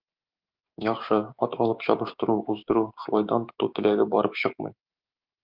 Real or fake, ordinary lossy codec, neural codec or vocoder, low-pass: fake; Opus, 24 kbps; codec, 16 kHz, 6 kbps, DAC; 5.4 kHz